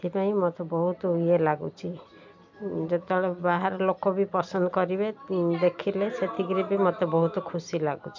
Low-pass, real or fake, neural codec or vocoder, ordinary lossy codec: 7.2 kHz; real; none; MP3, 64 kbps